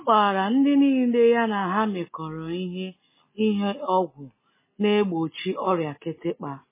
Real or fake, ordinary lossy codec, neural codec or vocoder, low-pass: real; MP3, 16 kbps; none; 3.6 kHz